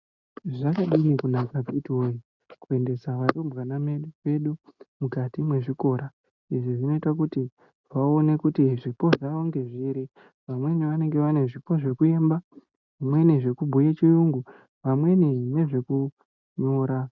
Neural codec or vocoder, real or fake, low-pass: none; real; 7.2 kHz